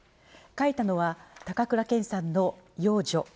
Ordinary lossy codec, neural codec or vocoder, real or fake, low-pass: none; none; real; none